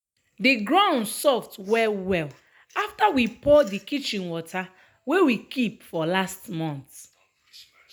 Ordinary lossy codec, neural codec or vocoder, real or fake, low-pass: none; none; real; none